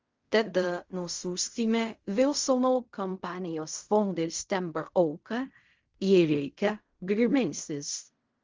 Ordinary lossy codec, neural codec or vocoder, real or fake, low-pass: Opus, 32 kbps; codec, 16 kHz in and 24 kHz out, 0.4 kbps, LongCat-Audio-Codec, fine tuned four codebook decoder; fake; 7.2 kHz